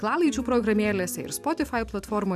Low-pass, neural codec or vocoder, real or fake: 14.4 kHz; vocoder, 44.1 kHz, 128 mel bands every 512 samples, BigVGAN v2; fake